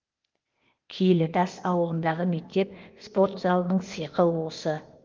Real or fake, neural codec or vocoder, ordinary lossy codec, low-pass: fake; codec, 16 kHz, 0.8 kbps, ZipCodec; Opus, 24 kbps; 7.2 kHz